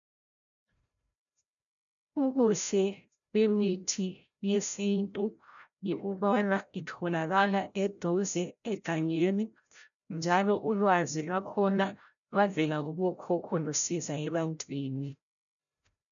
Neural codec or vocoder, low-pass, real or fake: codec, 16 kHz, 0.5 kbps, FreqCodec, larger model; 7.2 kHz; fake